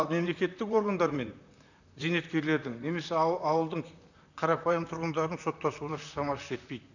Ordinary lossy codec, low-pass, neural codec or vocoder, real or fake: none; 7.2 kHz; vocoder, 44.1 kHz, 128 mel bands, Pupu-Vocoder; fake